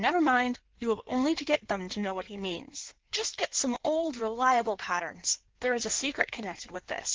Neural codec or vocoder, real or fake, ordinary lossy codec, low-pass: codec, 16 kHz in and 24 kHz out, 1.1 kbps, FireRedTTS-2 codec; fake; Opus, 16 kbps; 7.2 kHz